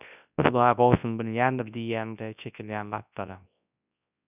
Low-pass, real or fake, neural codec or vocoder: 3.6 kHz; fake; codec, 24 kHz, 0.9 kbps, WavTokenizer, large speech release